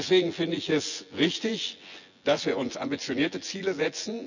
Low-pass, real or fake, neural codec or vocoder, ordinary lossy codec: 7.2 kHz; fake; vocoder, 24 kHz, 100 mel bands, Vocos; none